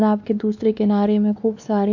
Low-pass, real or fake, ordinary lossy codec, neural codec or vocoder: 7.2 kHz; fake; AAC, 48 kbps; codec, 16 kHz, 2 kbps, X-Codec, WavLM features, trained on Multilingual LibriSpeech